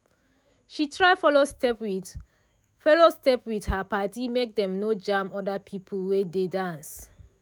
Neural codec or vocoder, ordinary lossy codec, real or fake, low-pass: autoencoder, 48 kHz, 128 numbers a frame, DAC-VAE, trained on Japanese speech; none; fake; none